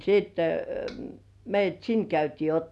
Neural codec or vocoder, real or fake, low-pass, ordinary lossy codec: none; real; none; none